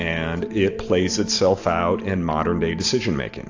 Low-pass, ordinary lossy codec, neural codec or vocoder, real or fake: 7.2 kHz; AAC, 48 kbps; vocoder, 44.1 kHz, 128 mel bands every 512 samples, BigVGAN v2; fake